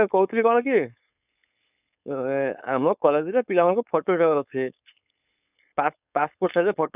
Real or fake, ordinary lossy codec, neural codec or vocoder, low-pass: fake; none; codec, 16 kHz, 4.8 kbps, FACodec; 3.6 kHz